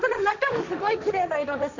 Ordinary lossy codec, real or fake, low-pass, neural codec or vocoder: Opus, 64 kbps; fake; 7.2 kHz; codec, 16 kHz, 1.1 kbps, Voila-Tokenizer